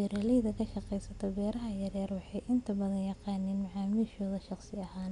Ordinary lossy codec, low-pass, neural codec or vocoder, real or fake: none; 10.8 kHz; none; real